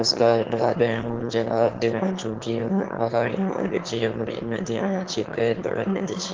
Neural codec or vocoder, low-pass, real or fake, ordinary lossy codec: autoencoder, 22.05 kHz, a latent of 192 numbers a frame, VITS, trained on one speaker; 7.2 kHz; fake; Opus, 16 kbps